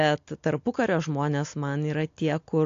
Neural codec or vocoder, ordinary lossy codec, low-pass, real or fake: none; AAC, 48 kbps; 7.2 kHz; real